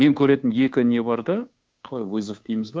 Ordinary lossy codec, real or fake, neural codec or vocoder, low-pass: none; fake; codec, 16 kHz, 2 kbps, FunCodec, trained on Chinese and English, 25 frames a second; none